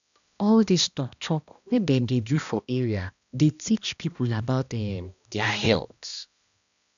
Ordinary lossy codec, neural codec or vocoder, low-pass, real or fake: none; codec, 16 kHz, 1 kbps, X-Codec, HuBERT features, trained on balanced general audio; 7.2 kHz; fake